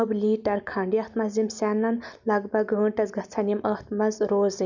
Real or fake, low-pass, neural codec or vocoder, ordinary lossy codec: real; 7.2 kHz; none; none